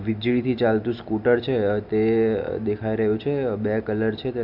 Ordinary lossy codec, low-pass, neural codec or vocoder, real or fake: MP3, 48 kbps; 5.4 kHz; none; real